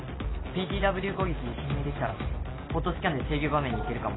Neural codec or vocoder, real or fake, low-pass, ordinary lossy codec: none; real; 7.2 kHz; AAC, 16 kbps